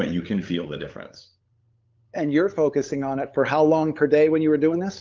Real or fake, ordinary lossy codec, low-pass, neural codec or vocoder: fake; Opus, 24 kbps; 7.2 kHz; codec, 16 kHz, 8 kbps, FunCodec, trained on LibriTTS, 25 frames a second